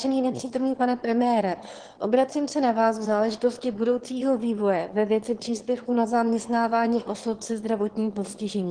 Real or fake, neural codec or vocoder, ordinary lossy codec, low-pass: fake; autoencoder, 22.05 kHz, a latent of 192 numbers a frame, VITS, trained on one speaker; Opus, 16 kbps; 9.9 kHz